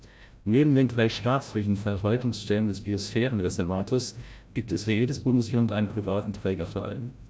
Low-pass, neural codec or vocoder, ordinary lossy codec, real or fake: none; codec, 16 kHz, 0.5 kbps, FreqCodec, larger model; none; fake